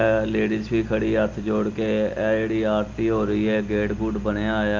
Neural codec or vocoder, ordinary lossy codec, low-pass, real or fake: none; Opus, 24 kbps; 7.2 kHz; real